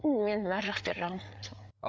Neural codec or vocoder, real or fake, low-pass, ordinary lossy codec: codec, 16 kHz, 8 kbps, FreqCodec, larger model; fake; none; none